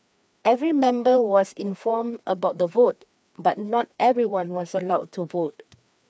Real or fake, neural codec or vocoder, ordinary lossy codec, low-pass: fake; codec, 16 kHz, 2 kbps, FreqCodec, larger model; none; none